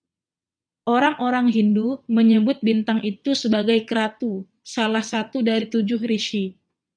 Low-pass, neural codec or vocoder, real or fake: 9.9 kHz; vocoder, 22.05 kHz, 80 mel bands, WaveNeXt; fake